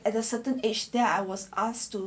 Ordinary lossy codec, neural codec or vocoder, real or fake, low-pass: none; none; real; none